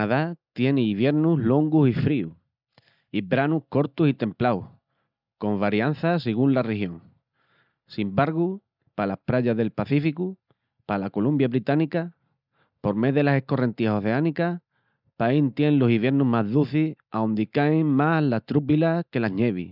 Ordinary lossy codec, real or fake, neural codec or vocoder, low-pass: none; real; none; 5.4 kHz